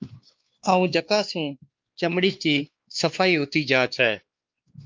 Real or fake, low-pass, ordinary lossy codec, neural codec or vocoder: fake; 7.2 kHz; Opus, 24 kbps; codec, 16 kHz, 2 kbps, X-Codec, WavLM features, trained on Multilingual LibriSpeech